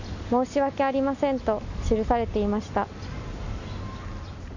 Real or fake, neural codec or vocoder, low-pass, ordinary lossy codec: real; none; 7.2 kHz; none